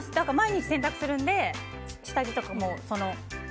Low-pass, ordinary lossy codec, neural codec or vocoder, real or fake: none; none; none; real